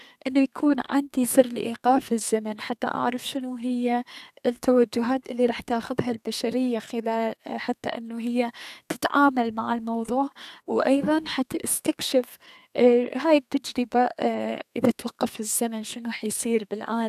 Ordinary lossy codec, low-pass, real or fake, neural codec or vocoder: none; 14.4 kHz; fake; codec, 32 kHz, 1.9 kbps, SNAC